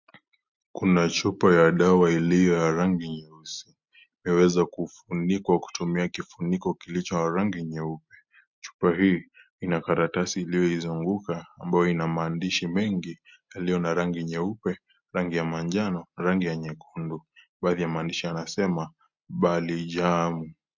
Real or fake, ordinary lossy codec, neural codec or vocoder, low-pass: real; MP3, 64 kbps; none; 7.2 kHz